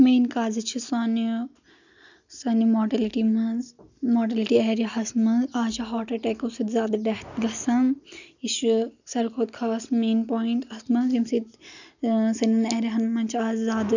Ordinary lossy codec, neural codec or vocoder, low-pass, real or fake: none; none; 7.2 kHz; real